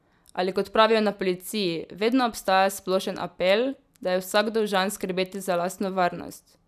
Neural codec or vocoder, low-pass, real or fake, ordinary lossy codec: none; 14.4 kHz; real; none